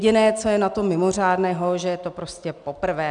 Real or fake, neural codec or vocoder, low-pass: real; none; 9.9 kHz